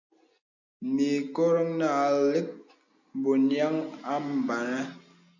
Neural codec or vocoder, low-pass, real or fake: none; 7.2 kHz; real